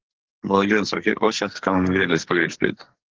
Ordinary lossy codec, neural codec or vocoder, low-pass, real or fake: Opus, 16 kbps; codec, 44.1 kHz, 2.6 kbps, SNAC; 7.2 kHz; fake